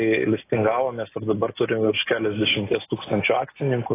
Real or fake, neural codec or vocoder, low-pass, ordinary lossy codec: real; none; 3.6 kHz; AAC, 16 kbps